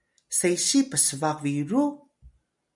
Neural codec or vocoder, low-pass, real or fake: none; 10.8 kHz; real